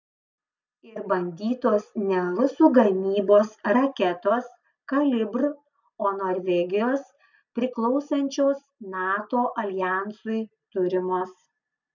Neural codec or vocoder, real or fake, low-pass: none; real; 7.2 kHz